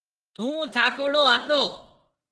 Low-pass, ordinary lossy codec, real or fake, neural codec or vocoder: 10.8 kHz; Opus, 16 kbps; fake; codec, 16 kHz in and 24 kHz out, 0.9 kbps, LongCat-Audio-Codec, four codebook decoder